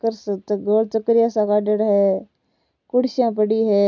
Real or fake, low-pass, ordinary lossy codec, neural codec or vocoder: real; 7.2 kHz; none; none